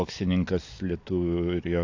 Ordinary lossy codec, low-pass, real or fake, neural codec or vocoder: AAC, 48 kbps; 7.2 kHz; real; none